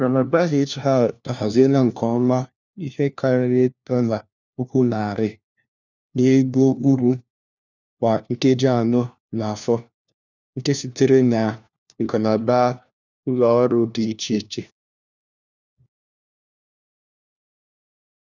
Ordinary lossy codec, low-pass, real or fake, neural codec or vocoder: none; 7.2 kHz; fake; codec, 16 kHz, 1 kbps, FunCodec, trained on LibriTTS, 50 frames a second